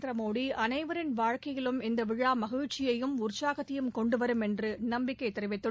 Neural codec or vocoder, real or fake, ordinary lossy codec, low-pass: none; real; none; none